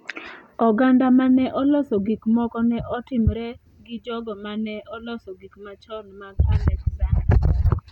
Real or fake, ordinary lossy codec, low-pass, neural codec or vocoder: real; none; 19.8 kHz; none